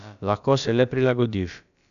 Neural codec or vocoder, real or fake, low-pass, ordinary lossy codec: codec, 16 kHz, about 1 kbps, DyCAST, with the encoder's durations; fake; 7.2 kHz; none